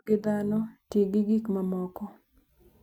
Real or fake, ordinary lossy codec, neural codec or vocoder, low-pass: real; none; none; 19.8 kHz